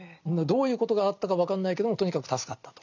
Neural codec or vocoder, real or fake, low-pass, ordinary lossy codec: none; real; 7.2 kHz; none